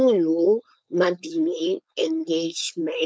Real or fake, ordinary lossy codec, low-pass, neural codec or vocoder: fake; none; none; codec, 16 kHz, 4.8 kbps, FACodec